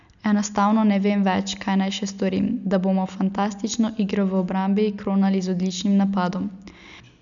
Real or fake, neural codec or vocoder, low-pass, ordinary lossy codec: real; none; 7.2 kHz; none